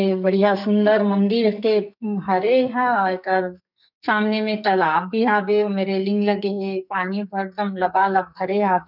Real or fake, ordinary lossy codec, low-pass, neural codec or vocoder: fake; none; 5.4 kHz; codec, 44.1 kHz, 2.6 kbps, SNAC